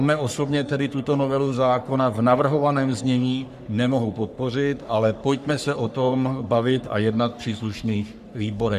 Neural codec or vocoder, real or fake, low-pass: codec, 44.1 kHz, 3.4 kbps, Pupu-Codec; fake; 14.4 kHz